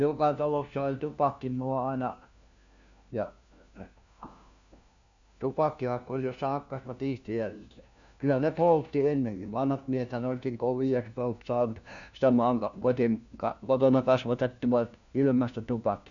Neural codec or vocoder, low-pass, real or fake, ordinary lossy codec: codec, 16 kHz, 1 kbps, FunCodec, trained on LibriTTS, 50 frames a second; 7.2 kHz; fake; none